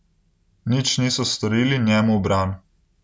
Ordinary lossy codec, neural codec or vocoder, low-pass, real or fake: none; none; none; real